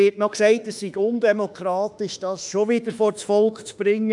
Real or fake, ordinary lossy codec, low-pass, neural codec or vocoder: fake; none; 10.8 kHz; autoencoder, 48 kHz, 32 numbers a frame, DAC-VAE, trained on Japanese speech